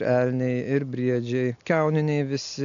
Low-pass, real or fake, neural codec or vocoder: 7.2 kHz; real; none